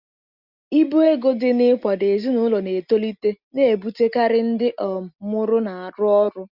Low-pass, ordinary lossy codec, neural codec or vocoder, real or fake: 5.4 kHz; Opus, 64 kbps; none; real